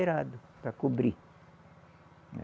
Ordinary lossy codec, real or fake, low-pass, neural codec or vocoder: none; real; none; none